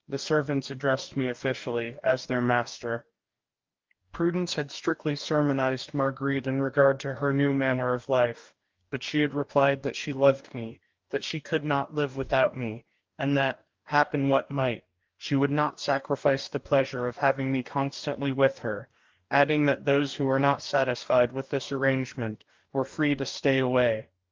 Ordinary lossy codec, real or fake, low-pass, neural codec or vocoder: Opus, 32 kbps; fake; 7.2 kHz; codec, 44.1 kHz, 2.6 kbps, DAC